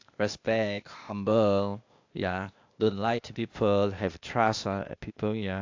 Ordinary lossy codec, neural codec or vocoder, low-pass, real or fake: AAC, 48 kbps; codec, 16 kHz, 0.8 kbps, ZipCodec; 7.2 kHz; fake